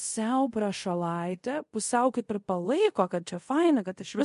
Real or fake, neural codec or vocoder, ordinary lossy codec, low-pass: fake; codec, 24 kHz, 0.5 kbps, DualCodec; MP3, 48 kbps; 10.8 kHz